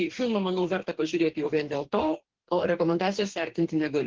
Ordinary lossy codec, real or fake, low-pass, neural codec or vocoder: Opus, 16 kbps; fake; 7.2 kHz; codec, 44.1 kHz, 2.6 kbps, DAC